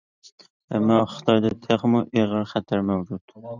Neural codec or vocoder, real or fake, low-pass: vocoder, 44.1 kHz, 128 mel bands every 512 samples, BigVGAN v2; fake; 7.2 kHz